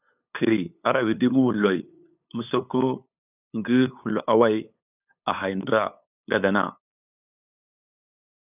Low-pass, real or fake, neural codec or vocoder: 3.6 kHz; fake; codec, 16 kHz, 8 kbps, FunCodec, trained on LibriTTS, 25 frames a second